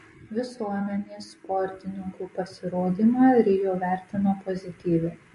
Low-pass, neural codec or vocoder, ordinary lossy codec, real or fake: 14.4 kHz; none; MP3, 48 kbps; real